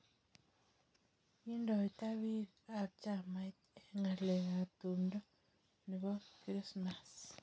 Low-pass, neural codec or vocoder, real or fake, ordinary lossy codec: none; none; real; none